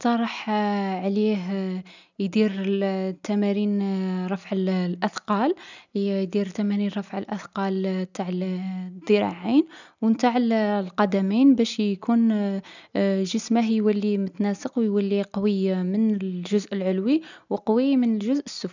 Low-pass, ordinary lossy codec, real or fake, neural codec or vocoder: 7.2 kHz; none; real; none